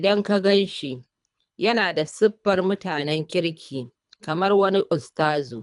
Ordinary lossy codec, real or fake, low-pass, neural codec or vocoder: none; fake; 10.8 kHz; codec, 24 kHz, 3 kbps, HILCodec